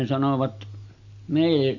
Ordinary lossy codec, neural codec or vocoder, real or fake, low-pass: none; none; real; 7.2 kHz